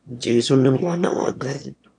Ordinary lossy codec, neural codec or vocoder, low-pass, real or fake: none; autoencoder, 22.05 kHz, a latent of 192 numbers a frame, VITS, trained on one speaker; 9.9 kHz; fake